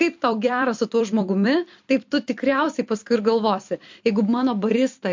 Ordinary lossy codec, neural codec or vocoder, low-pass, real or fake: MP3, 48 kbps; vocoder, 44.1 kHz, 128 mel bands every 256 samples, BigVGAN v2; 7.2 kHz; fake